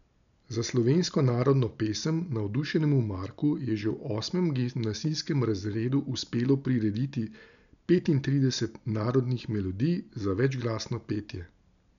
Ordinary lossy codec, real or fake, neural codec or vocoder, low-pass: none; real; none; 7.2 kHz